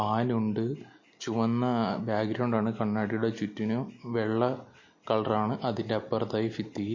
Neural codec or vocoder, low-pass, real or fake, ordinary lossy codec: none; 7.2 kHz; real; MP3, 32 kbps